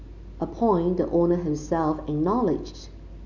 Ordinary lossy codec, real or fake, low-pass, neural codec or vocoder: none; real; 7.2 kHz; none